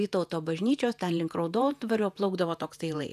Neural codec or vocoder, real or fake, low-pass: vocoder, 48 kHz, 128 mel bands, Vocos; fake; 14.4 kHz